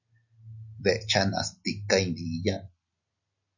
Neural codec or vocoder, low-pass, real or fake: none; 7.2 kHz; real